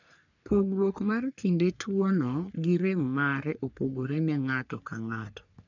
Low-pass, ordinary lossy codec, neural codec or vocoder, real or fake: 7.2 kHz; none; codec, 44.1 kHz, 3.4 kbps, Pupu-Codec; fake